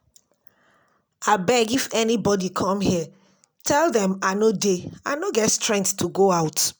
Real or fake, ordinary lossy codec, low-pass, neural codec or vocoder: real; none; none; none